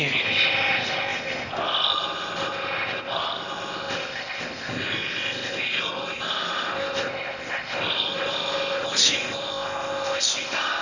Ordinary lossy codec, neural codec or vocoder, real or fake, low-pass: none; codec, 16 kHz in and 24 kHz out, 0.8 kbps, FocalCodec, streaming, 65536 codes; fake; 7.2 kHz